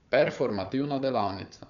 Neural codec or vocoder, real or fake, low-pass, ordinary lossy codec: codec, 16 kHz, 4 kbps, FunCodec, trained on Chinese and English, 50 frames a second; fake; 7.2 kHz; none